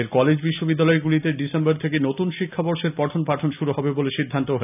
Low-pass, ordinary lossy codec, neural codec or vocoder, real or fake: 3.6 kHz; none; none; real